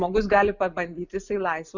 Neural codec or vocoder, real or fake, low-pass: none; real; 7.2 kHz